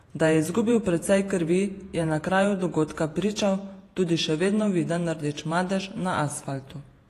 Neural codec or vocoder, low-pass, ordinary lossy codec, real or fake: vocoder, 48 kHz, 128 mel bands, Vocos; 14.4 kHz; AAC, 48 kbps; fake